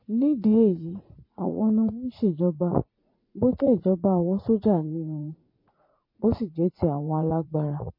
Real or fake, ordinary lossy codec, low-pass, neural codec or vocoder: fake; MP3, 24 kbps; 5.4 kHz; vocoder, 44.1 kHz, 80 mel bands, Vocos